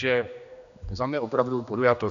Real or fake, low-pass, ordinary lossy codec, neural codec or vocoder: fake; 7.2 kHz; MP3, 96 kbps; codec, 16 kHz, 2 kbps, X-Codec, HuBERT features, trained on general audio